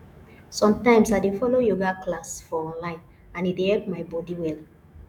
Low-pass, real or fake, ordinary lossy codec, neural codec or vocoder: 19.8 kHz; fake; none; autoencoder, 48 kHz, 128 numbers a frame, DAC-VAE, trained on Japanese speech